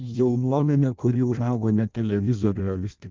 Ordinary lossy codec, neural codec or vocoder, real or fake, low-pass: Opus, 24 kbps; codec, 16 kHz in and 24 kHz out, 0.6 kbps, FireRedTTS-2 codec; fake; 7.2 kHz